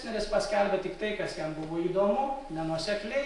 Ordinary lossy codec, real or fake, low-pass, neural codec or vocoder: AAC, 48 kbps; real; 10.8 kHz; none